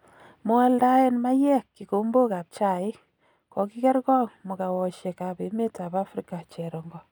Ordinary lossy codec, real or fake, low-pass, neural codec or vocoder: none; real; none; none